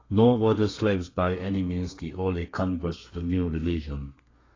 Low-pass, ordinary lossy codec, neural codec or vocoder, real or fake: 7.2 kHz; AAC, 32 kbps; codec, 32 kHz, 1.9 kbps, SNAC; fake